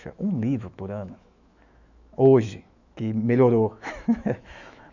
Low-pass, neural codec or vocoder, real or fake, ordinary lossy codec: 7.2 kHz; autoencoder, 48 kHz, 128 numbers a frame, DAC-VAE, trained on Japanese speech; fake; none